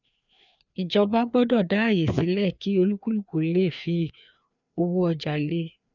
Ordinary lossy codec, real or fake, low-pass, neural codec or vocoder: none; fake; 7.2 kHz; codec, 16 kHz, 2 kbps, FreqCodec, larger model